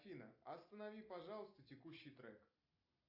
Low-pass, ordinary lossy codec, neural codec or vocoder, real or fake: 5.4 kHz; AAC, 32 kbps; none; real